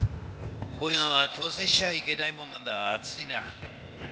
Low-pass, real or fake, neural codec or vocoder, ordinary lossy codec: none; fake; codec, 16 kHz, 0.8 kbps, ZipCodec; none